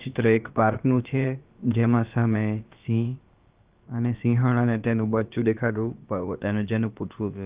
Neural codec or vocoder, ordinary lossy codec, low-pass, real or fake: codec, 16 kHz, about 1 kbps, DyCAST, with the encoder's durations; Opus, 32 kbps; 3.6 kHz; fake